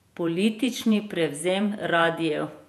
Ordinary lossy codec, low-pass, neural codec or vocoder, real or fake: none; 14.4 kHz; vocoder, 48 kHz, 128 mel bands, Vocos; fake